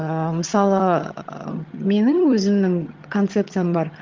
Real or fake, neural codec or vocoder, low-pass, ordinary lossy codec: fake; vocoder, 22.05 kHz, 80 mel bands, HiFi-GAN; 7.2 kHz; Opus, 32 kbps